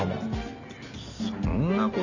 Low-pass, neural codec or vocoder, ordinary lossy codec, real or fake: 7.2 kHz; none; none; real